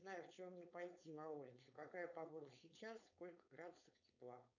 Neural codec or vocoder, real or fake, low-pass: codec, 16 kHz, 4.8 kbps, FACodec; fake; 7.2 kHz